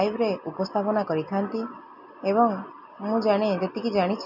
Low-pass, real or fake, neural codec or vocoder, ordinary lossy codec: 5.4 kHz; real; none; none